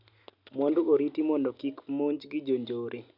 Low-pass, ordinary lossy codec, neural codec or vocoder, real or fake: 5.4 kHz; none; none; real